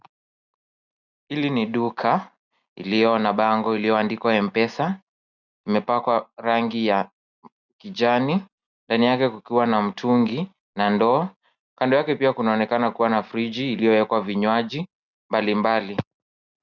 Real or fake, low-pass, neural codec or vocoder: real; 7.2 kHz; none